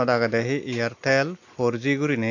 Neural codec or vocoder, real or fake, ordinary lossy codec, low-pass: none; real; AAC, 48 kbps; 7.2 kHz